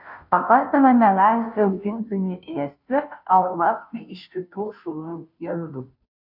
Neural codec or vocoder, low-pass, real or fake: codec, 16 kHz, 0.5 kbps, FunCodec, trained on Chinese and English, 25 frames a second; 5.4 kHz; fake